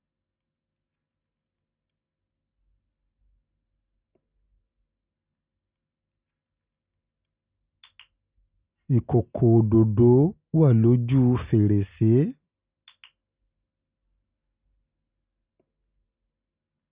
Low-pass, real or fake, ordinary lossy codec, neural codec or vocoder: 3.6 kHz; real; Opus, 64 kbps; none